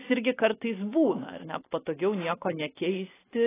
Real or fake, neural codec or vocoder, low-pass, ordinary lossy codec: real; none; 3.6 kHz; AAC, 16 kbps